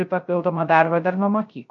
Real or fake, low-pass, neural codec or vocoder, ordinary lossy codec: fake; 7.2 kHz; codec, 16 kHz, 0.3 kbps, FocalCodec; MP3, 48 kbps